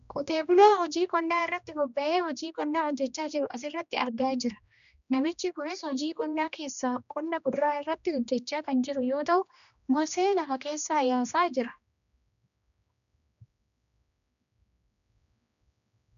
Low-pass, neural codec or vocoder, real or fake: 7.2 kHz; codec, 16 kHz, 1 kbps, X-Codec, HuBERT features, trained on general audio; fake